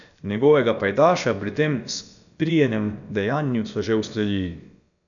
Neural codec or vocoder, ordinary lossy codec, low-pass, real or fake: codec, 16 kHz, about 1 kbps, DyCAST, with the encoder's durations; none; 7.2 kHz; fake